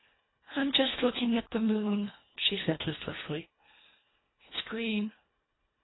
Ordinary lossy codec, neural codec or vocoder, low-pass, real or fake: AAC, 16 kbps; codec, 24 kHz, 1.5 kbps, HILCodec; 7.2 kHz; fake